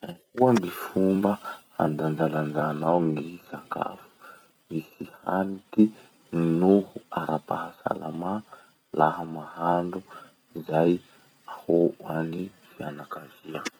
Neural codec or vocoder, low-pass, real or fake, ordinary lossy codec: vocoder, 44.1 kHz, 128 mel bands every 256 samples, BigVGAN v2; none; fake; none